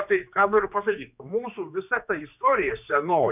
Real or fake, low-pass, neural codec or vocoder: fake; 3.6 kHz; codec, 16 kHz, 2 kbps, X-Codec, HuBERT features, trained on general audio